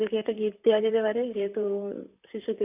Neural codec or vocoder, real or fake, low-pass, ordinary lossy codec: vocoder, 44.1 kHz, 128 mel bands, Pupu-Vocoder; fake; 3.6 kHz; none